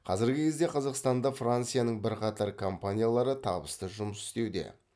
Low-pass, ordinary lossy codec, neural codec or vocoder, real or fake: none; none; none; real